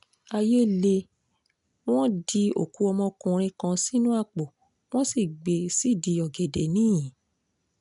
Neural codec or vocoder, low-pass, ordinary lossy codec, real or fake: none; 10.8 kHz; none; real